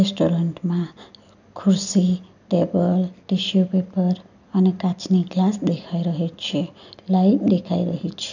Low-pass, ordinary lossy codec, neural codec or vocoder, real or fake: 7.2 kHz; none; none; real